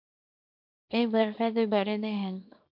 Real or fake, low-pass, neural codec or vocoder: fake; 5.4 kHz; codec, 24 kHz, 0.9 kbps, WavTokenizer, small release